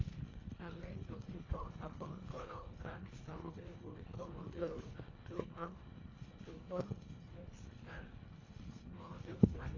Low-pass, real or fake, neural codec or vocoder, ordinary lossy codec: 7.2 kHz; fake; codec, 24 kHz, 1.5 kbps, HILCodec; AAC, 32 kbps